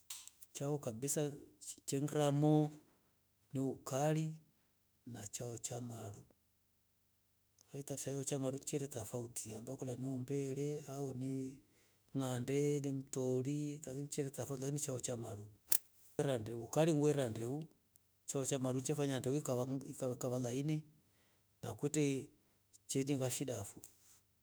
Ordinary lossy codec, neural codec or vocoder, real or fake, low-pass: none; autoencoder, 48 kHz, 32 numbers a frame, DAC-VAE, trained on Japanese speech; fake; none